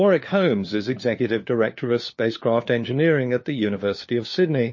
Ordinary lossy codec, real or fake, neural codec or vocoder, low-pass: MP3, 32 kbps; fake; codec, 16 kHz, 4 kbps, FunCodec, trained on LibriTTS, 50 frames a second; 7.2 kHz